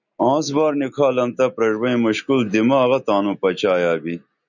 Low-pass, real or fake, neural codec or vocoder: 7.2 kHz; real; none